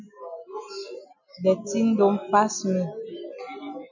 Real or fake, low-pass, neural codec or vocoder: real; 7.2 kHz; none